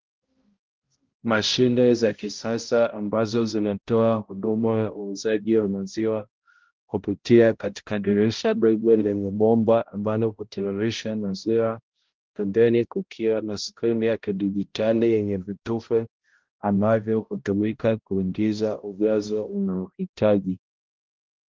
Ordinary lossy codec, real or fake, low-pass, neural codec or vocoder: Opus, 16 kbps; fake; 7.2 kHz; codec, 16 kHz, 0.5 kbps, X-Codec, HuBERT features, trained on balanced general audio